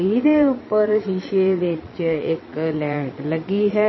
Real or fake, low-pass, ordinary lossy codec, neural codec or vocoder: fake; 7.2 kHz; MP3, 24 kbps; vocoder, 22.05 kHz, 80 mel bands, Vocos